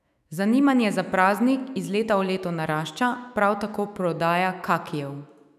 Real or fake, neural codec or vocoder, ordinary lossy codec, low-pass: fake; autoencoder, 48 kHz, 128 numbers a frame, DAC-VAE, trained on Japanese speech; none; 14.4 kHz